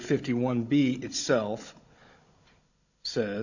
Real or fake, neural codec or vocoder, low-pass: fake; codec, 16 kHz, 16 kbps, FunCodec, trained on Chinese and English, 50 frames a second; 7.2 kHz